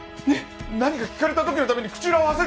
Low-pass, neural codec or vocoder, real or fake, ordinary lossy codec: none; none; real; none